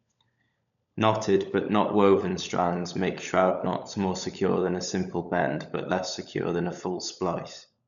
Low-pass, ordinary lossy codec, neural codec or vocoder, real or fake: 7.2 kHz; none; codec, 16 kHz, 16 kbps, FunCodec, trained on LibriTTS, 50 frames a second; fake